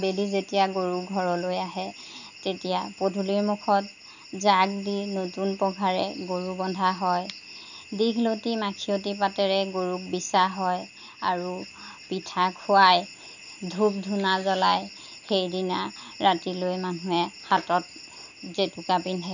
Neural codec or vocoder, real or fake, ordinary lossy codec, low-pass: none; real; none; 7.2 kHz